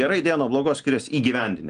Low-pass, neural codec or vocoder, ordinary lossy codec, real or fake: 9.9 kHz; none; Opus, 24 kbps; real